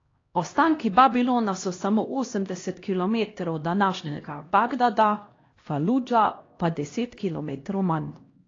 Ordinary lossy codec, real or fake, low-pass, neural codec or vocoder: AAC, 32 kbps; fake; 7.2 kHz; codec, 16 kHz, 1 kbps, X-Codec, HuBERT features, trained on LibriSpeech